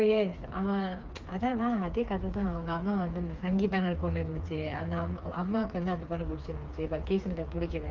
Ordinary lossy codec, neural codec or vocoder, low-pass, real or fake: Opus, 24 kbps; codec, 16 kHz, 4 kbps, FreqCodec, smaller model; 7.2 kHz; fake